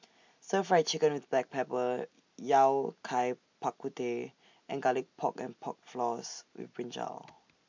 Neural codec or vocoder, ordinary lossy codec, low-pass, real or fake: none; MP3, 48 kbps; 7.2 kHz; real